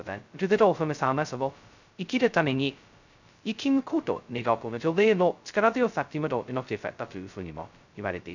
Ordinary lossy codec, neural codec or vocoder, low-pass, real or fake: none; codec, 16 kHz, 0.2 kbps, FocalCodec; 7.2 kHz; fake